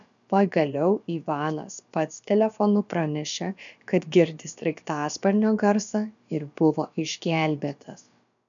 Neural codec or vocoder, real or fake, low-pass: codec, 16 kHz, about 1 kbps, DyCAST, with the encoder's durations; fake; 7.2 kHz